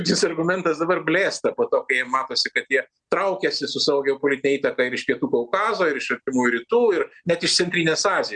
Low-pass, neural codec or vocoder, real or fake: 9.9 kHz; none; real